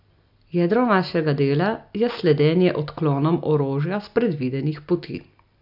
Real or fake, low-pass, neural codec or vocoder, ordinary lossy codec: real; 5.4 kHz; none; none